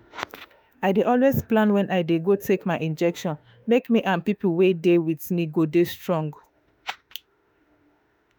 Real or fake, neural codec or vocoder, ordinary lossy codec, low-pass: fake; autoencoder, 48 kHz, 32 numbers a frame, DAC-VAE, trained on Japanese speech; none; none